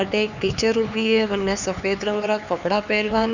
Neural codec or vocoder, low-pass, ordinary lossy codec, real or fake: codec, 16 kHz, 4 kbps, X-Codec, HuBERT features, trained on LibriSpeech; 7.2 kHz; none; fake